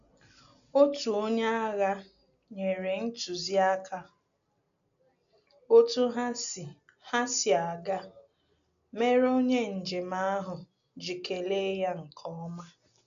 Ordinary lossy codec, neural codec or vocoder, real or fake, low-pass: none; none; real; 7.2 kHz